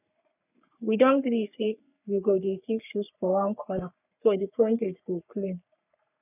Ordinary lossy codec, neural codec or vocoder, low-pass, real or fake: none; codec, 44.1 kHz, 3.4 kbps, Pupu-Codec; 3.6 kHz; fake